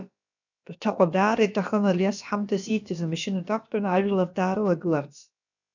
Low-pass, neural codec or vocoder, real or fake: 7.2 kHz; codec, 16 kHz, about 1 kbps, DyCAST, with the encoder's durations; fake